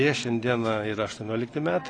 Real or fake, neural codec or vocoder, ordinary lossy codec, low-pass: real; none; AAC, 32 kbps; 9.9 kHz